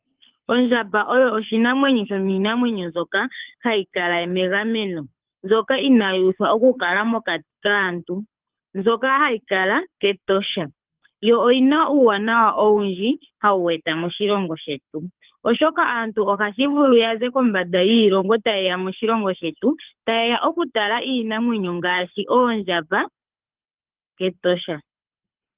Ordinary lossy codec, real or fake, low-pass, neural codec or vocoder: Opus, 32 kbps; fake; 3.6 kHz; codec, 24 kHz, 6 kbps, HILCodec